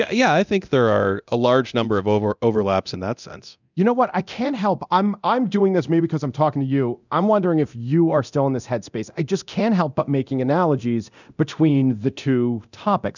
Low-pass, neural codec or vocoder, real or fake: 7.2 kHz; codec, 24 kHz, 0.9 kbps, DualCodec; fake